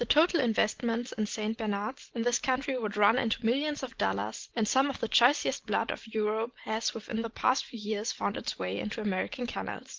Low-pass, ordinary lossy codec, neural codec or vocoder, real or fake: 7.2 kHz; Opus, 16 kbps; none; real